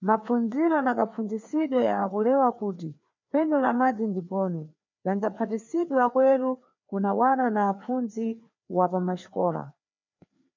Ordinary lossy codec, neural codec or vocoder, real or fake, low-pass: MP3, 64 kbps; codec, 16 kHz, 2 kbps, FreqCodec, larger model; fake; 7.2 kHz